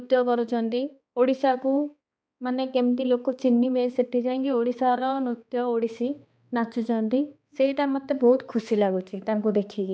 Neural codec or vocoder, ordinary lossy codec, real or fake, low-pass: codec, 16 kHz, 2 kbps, X-Codec, HuBERT features, trained on balanced general audio; none; fake; none